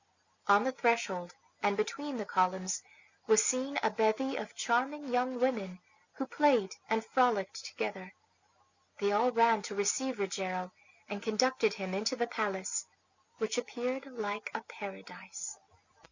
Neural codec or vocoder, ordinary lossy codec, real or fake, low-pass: none; Opus, 64 kbps; real; 7.2 kHz